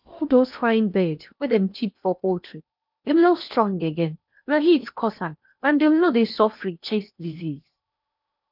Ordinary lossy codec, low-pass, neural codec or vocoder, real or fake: none; 5.4 kHz; codec, 16 kHz in and 24 kHz out, 0.8 kbps, FocalCodec, streaming, 65536 codes; fake